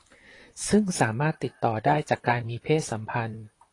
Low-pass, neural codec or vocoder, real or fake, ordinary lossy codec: 10.8 kHz; vocoder, 44.1 kHz, 128 mel bands, Pupu-Vocoder; fake; AAC, 32 kbps